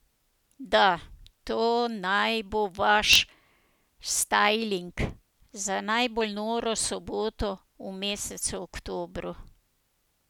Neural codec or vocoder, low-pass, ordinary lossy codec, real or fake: none; 19.8 kHz; none; real